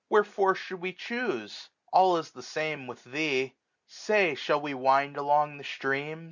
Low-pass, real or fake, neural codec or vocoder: 7.2 kHz; real; none